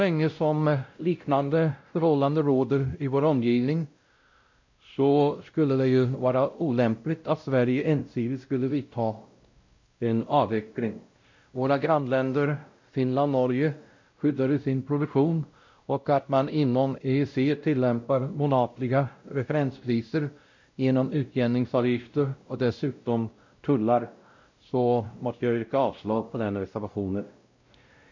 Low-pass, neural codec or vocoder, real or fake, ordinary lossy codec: 7.2 kHz; codec, 16 kHz, 0.5 kbps, X-Codec, WavLM features, trained on Multilingual LibriSpeech; fake; MP3, 48 kbps